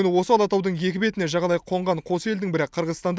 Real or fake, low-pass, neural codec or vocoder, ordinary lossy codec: real; none; none; none